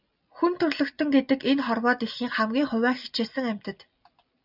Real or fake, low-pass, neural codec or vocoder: real; 5.4 kHz; none